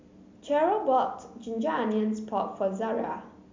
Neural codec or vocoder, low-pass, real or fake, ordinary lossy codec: none; 7.2 kHz; real; MP3, 64 kbps